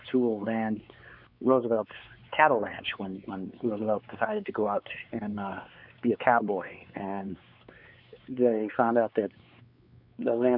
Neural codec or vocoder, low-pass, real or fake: codec, 16 kHz, 4 kbps, X-Codec, WavLM features, trained on Multilingual LibriSpeech; 5.4 kHz; fake